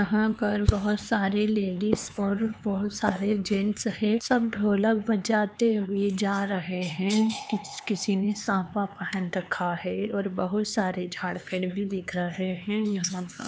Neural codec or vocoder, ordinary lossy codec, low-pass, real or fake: codec, 16 kHz, 4 kbps, X-Codec, HuBERT features, trained on LibriSpeech; none; none; fake